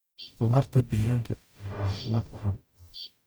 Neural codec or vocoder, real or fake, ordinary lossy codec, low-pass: codec, 44.1 kHz, 0.9 kbps, DAC; fake; none; none